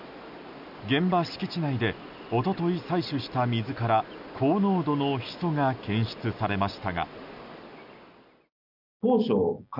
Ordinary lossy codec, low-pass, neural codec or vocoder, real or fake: none; 5.4 kHz; none; real